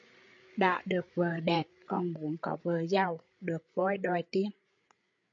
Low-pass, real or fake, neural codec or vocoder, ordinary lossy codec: 7.2 kHz; fake; codec, 16 kHz, 8 kbps, FreqCodec, larger model; AAC, 48 kbps